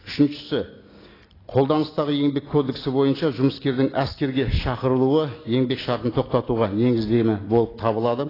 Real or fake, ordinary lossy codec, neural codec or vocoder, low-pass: real; AAC, 24 kbps; none; 5.4 kHz